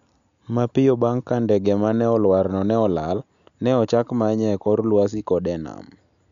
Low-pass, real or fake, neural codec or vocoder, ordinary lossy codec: 7.2 kHz; real; none; none